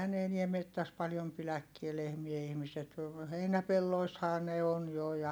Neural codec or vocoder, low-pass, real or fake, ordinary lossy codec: none; none; real; none